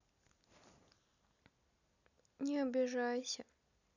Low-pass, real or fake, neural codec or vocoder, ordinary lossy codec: 7.2 kHz; real; none; none